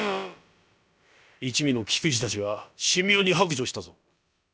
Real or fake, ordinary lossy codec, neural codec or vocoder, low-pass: fake; none; codec, 16 kHz, about 1 kbps, DyCAST, with the encoder's durations; none